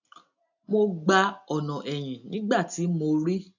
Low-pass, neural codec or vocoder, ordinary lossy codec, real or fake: 7.2 kHz; none; none; real